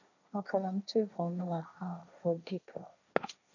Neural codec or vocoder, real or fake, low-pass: codec, 16 kHz, 1.1 kbps, Voila-Tokenizer; fake; 7.2 kHz